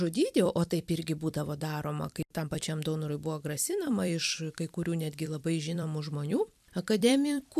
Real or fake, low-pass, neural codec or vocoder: fake; 14.4 kHz; vocoder, 44.1 kHz, 128 mel bands every 256 samples, BigVGAN v2